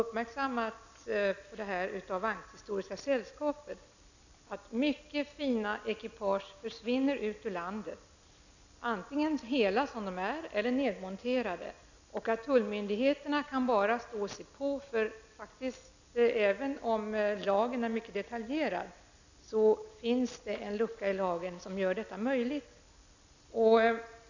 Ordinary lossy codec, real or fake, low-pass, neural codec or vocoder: none; real; 7.2 kHz; none